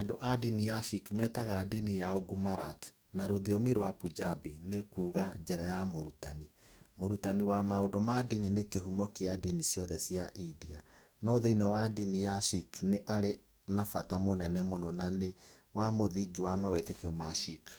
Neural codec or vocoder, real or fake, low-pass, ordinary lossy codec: codec, 44.1 kHz, 2.6 kbps, DAC; fake; none; none